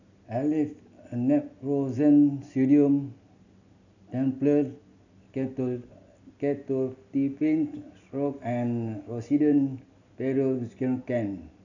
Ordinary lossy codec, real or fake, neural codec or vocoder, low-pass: none; real; none; 7.2 kHz